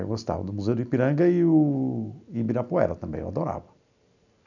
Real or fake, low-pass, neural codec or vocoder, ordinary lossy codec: real; 7.2 kHz; none; none